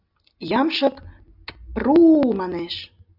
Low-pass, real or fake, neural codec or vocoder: 5.4 kHz; real; none